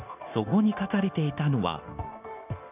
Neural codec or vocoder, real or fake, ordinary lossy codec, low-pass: codec, 16 kHz, 0.9 kbps, LongCat-Audio-Codec; fake; none; 3.6 kHz